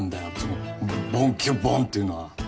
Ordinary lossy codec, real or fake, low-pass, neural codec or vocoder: none; real; none; none